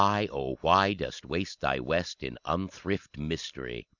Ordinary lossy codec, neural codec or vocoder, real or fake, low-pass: Opus, 64 kbps; none; real; 7.2 kHz